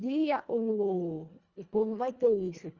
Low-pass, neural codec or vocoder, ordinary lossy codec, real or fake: 7.2 kHz; codec, 24 kHz, 1.5 kbps, HILCodec; Opus, 32 kbps; fake